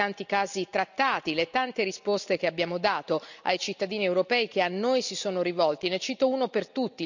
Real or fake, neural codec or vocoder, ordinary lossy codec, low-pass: real; none; none; 7.2 kHz